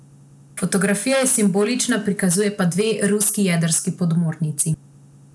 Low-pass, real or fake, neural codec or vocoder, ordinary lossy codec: none; real; none; none